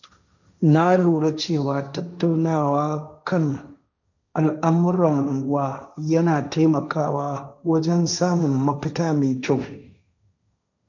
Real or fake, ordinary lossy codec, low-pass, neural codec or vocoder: fake; none; 7.2 kHz; codec, 16 kHz, 1.1 kbps, Voila-Tokenizer